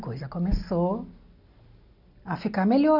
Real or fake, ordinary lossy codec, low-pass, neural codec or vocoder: real; none; 5.4 kHz; none